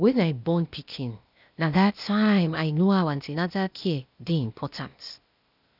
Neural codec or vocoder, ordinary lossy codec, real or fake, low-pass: codec, 16 kHz, 0.8 kbps, ZipCodec; none; fake; 5.4 kHz